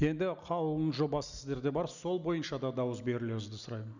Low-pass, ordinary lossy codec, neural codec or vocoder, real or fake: 7.2 kHz; none; none; real